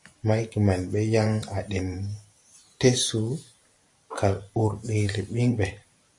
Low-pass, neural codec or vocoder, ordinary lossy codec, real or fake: 10.8 kHz; vocoder, 44.1 kHz, 128 mel bands every 512 samples, BigVGAN v2; AAC, 64 kbps; fake